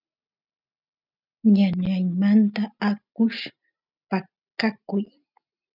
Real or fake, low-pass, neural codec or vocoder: real; 5.4 kHz; none